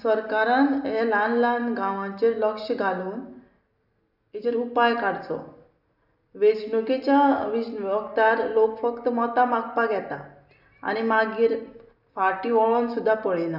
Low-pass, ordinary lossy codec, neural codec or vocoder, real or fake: 5.4 kHz; none; none; real